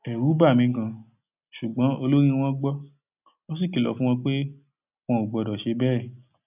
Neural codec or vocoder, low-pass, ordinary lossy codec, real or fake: none; 3.6 kHz; none; real